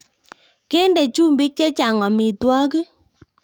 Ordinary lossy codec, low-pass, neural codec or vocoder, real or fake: none; 19.8 kHz; codec, 44.1 kHz, 7.8 kbps, DAC; fake